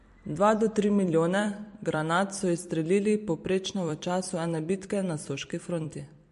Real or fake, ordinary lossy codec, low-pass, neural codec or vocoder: fake; MP3, 48 kbps; 14.4 kHz; vocoder, 44.1 kHz, 128 mel bands every 256 samples, BigVGAN v2